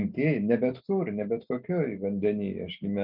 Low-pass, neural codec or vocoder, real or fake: 5.4 kHz; none; real